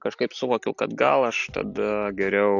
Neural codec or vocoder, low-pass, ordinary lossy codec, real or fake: none; 7.2 kHz; AAC, 48 kbps; real